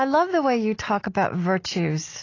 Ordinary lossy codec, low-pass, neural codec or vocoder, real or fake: AAC, 32 kbps; 7.2 kHz; none; real